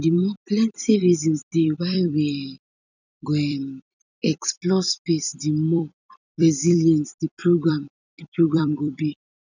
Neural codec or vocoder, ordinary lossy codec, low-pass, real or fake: vocoder, 44.1 kHz, 128 mel bands every 256 samples, BigVGAN v2; none; 7.2 kHz; fake